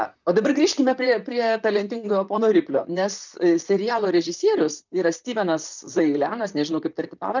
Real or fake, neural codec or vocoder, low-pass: fake; vocoder, 44.1 kHz, 128 mel bands, Pupu-Vocoder; 7.2 kHz